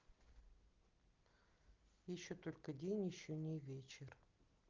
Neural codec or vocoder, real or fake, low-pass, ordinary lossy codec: none; real; 7.2 kHz; Opus, 32 kbps